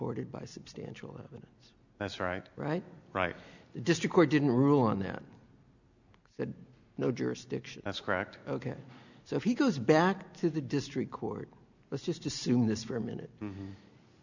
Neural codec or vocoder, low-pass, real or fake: none; 7.2 kHz; real